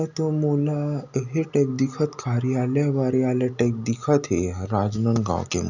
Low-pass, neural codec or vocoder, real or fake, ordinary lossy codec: 7.2 kHz; none; real; none